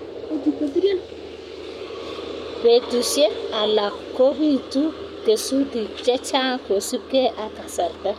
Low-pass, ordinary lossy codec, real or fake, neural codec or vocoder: 19.8 kHz; none; fake; codec, 44.1 kHz, 7.8 kbps, Pupu-Codec